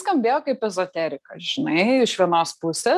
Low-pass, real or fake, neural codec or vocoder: 14.4 kHz; real; none